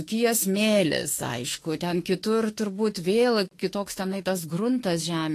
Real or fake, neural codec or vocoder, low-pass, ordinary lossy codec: fake; autoencoder, 48 kHz, 32 numbers a frame, DAC-VAE, trained on Japanese speech; 14.4 kHz; AAC, 48 kbps